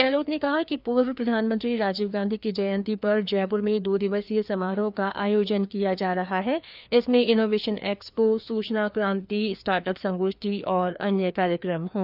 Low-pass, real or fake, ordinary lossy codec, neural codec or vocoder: 5.4 kHz; fake; none; codec, 16 kHz, 2 kbps, FreqCodec, larger model